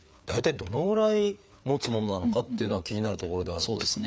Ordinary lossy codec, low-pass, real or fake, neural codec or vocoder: none; none; fake; codec, 16 kHz, 4 kbps, FreqCodec, larger model